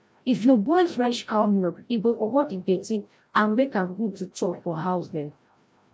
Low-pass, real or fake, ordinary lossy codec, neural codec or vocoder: none; fake; none; codec, 16 kHz, 0.5 kbps, FreqCodec, larger model